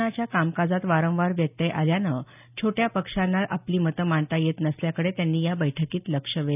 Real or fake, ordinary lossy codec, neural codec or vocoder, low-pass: real; none; none; 3.6 kHz